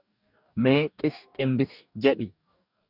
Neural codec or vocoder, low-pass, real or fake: codec, 44.1 kHz, 2.6 kbps, DAC; 5.4 kHz; fake